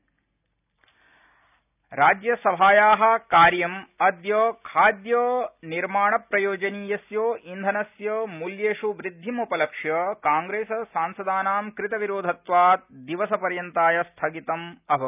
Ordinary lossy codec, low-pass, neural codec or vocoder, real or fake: none; 3.6 kHz; none; real